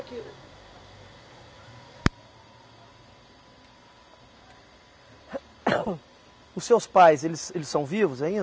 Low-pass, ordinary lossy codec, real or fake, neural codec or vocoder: none; none; real; none